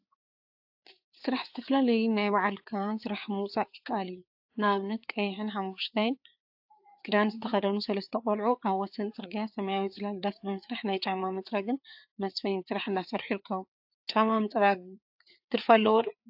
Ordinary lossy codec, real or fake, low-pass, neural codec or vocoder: AAC, 48 kbps; fake; 5.4 kHz; codec, 16 kHz, 4 kbps, FreqCodec, larger model